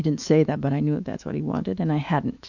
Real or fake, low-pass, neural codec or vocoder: fake; 7.2 kHz; autoencoder, 48 kHz, 128 numbers a frame, DAC-VAE, trained on Japanese speech